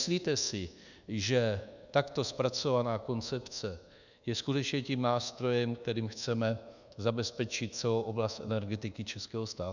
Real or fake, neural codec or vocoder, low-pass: fake; codec, 24 kHz, 1.2 kbps, DualCodec; 7.2 kHz